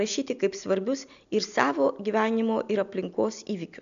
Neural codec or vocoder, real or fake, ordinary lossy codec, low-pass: none; real; AAC, 96 kbps; 7.2 kHz